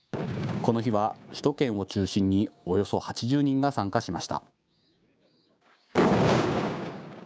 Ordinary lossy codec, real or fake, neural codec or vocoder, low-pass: none; fake; codec, 16 kHz, 6 kbps, DAC; none